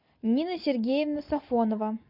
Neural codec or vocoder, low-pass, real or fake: none; 5.4 kHz; real